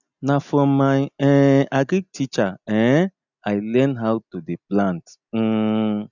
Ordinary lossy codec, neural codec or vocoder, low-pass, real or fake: none; none; 7.2 kHz; real